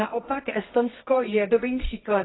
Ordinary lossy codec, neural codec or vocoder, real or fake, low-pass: AAC, 16 kbps; codec, 24 kHz, 0.9 kbps, WavTokenizer, medium music audio release; fake; 7.2 kHz